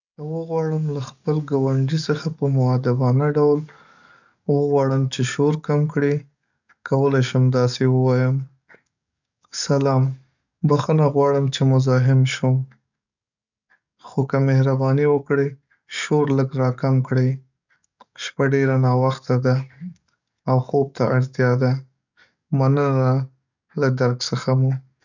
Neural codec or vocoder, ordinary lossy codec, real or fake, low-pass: codec, 44.1 kHz, 7.8 kbps, DAC; none; fake; 7.2 kHz